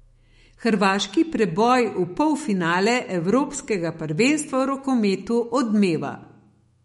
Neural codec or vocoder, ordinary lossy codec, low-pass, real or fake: autoencoder, 48 kHz, 128 numbers a frame, DAC-VAE, trained on Japanese speech; MP3, 48 kbps; 19.8 kHz; fake